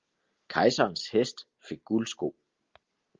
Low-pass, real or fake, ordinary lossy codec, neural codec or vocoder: 7.2 kHz; real; Opus, 32 kbps; none